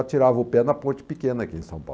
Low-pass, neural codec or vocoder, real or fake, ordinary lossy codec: none; none; real; none